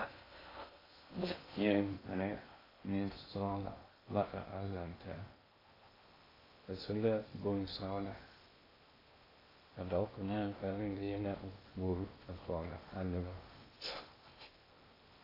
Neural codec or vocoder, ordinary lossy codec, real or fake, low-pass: codec, 16 kHz in and 24 kHz out, 0.6 kbps, FocalCodec, streaming, 2048 codes; AAC, 24 kbps; fake; 5.4 kHz